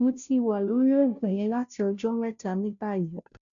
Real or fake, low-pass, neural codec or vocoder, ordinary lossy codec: fake; 7.2 kHz; codec, 16 kHz, 0.5 kbps, FunCodec, trained on Chinese and English, 25 frames a second; none